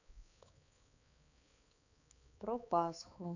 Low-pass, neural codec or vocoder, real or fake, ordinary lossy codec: 7.2 kHz; codec, 16 kHz, 4 kbps, X-Codec, HuBERT features, trained on balanced general audio; fake; none